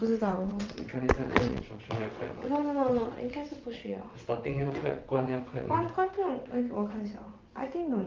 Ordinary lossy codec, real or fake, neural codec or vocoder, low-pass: Opus, 24 kbps; fake; vocoder, 22.05 kHz, 80 mel bands, WaveNeXt; 7.2 kHz